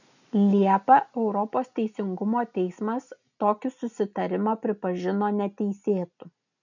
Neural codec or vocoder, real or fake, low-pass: none; real; 7.2 kHz